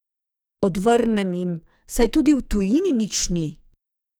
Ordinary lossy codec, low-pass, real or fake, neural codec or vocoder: none; none; fake; codec, 44.1 kHz, 2.6 kbps, SNAC